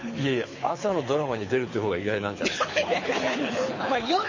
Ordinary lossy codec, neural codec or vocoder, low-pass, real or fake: MP3, 32 kbps; codec, 24 kHz, 6 kbps, HILCodec; 7.2 kHz; fake